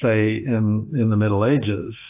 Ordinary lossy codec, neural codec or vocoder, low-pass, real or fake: AAC, 32 kbps; autoencoder, 48 kHz, 32 numbers a frame, DAC-VAE, trained on Japanese speech; 3.6 kHz; fake